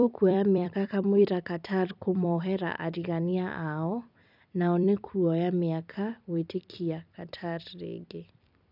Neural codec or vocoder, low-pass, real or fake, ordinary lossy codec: vocoder, 44.1 kHz, 128 mel bands every 256 samples, BigVGAN v2; 5.4 kHz; fake; none